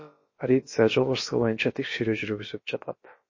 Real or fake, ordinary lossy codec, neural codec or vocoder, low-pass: fake; MP3, 32 kbps; codec, 16 kHz, about 1 kbps, DyCAST, with the encoder's durations; 7.2 kHz